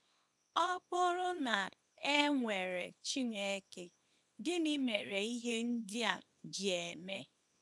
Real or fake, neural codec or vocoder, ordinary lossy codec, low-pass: fake; codec, 24 kHz, 0.9 kbps, WavTokenizer, small release; none; none